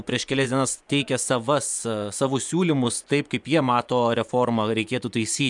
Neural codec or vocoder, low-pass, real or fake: vocoder, 24 kHz, 100 mel bands, Vocos; 10.8 kHz; fake